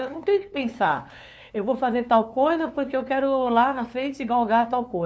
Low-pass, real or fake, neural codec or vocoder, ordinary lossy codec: none; fake; codec, 16 kHz, 2 kbps, FunCodec, trained on LibriTTS, 25 frames a second; none